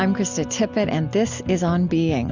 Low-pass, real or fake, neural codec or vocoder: 7.2 kHz; real; none